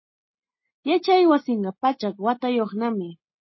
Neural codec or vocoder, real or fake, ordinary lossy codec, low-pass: none; real; MP3, 24 kbps; 7.2 kHz